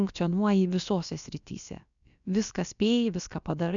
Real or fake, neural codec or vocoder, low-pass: fake; codec, 16 kHz, about 1 kbps, DyCAST, with the encoder's durations; 7.2 kHz